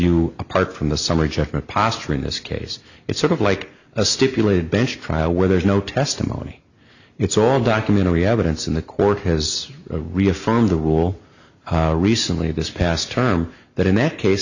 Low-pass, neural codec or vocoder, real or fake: 7.2 kHz; none; real